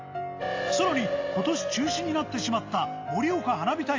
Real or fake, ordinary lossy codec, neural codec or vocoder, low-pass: real; AAC, 48 kbps; none; 7.2 kHz